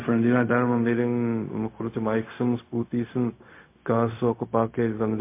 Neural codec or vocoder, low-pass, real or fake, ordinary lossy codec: codec, 16 kHz, 0.4 kbps, LongCat-Audio-Codec; 3.6 kHz; fake; MP3, 24 kbps